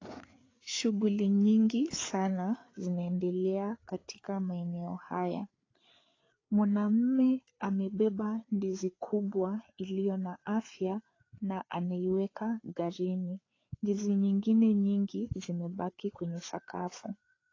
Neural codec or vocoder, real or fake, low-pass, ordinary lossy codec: codec, 16 kHz, 4 kbps, FreqCodec, larger model; fake; 7.2 kHz; AAC, 32 kbps